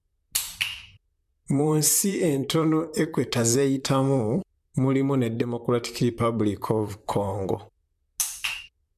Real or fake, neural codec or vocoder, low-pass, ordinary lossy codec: fake; vocoder, 44.1 kHz, 128 mel bands, Pupu-Vocoder; 14.4 kHz; MP3, 96 kbps